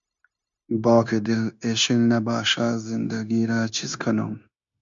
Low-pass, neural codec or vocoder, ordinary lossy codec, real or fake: 7.2 kHz; codec, 16 kHz, 0.9 kbps, LongCat-Audio-Codec; MP3, 64 kbps; fake